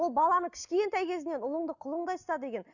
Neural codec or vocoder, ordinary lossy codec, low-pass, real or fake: none; none; 7.2 kHz; real